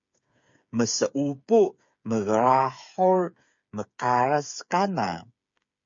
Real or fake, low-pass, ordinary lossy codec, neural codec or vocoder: fake; 7.2 kHz; MP3, 48 kbps; codec, 16 kHz, 8 kbps, FreqCodec, smaller model